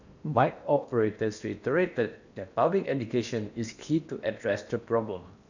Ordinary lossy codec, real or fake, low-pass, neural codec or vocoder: none; fake; 7.2 kHz; codec, 16 kHz in and 24 kHz out, 0.8 kbps, FocalCodec, streaming, 65536 codes